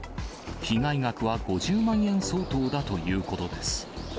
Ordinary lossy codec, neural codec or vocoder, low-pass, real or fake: none; none; none; real